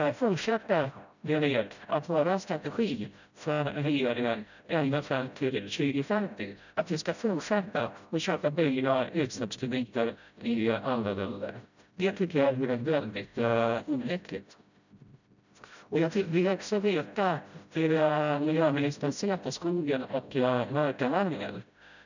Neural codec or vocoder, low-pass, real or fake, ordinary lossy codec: codec, 16 kHz, 0.5 kbps, FreqCodec, smaller model; 7.2 kHz; fake; none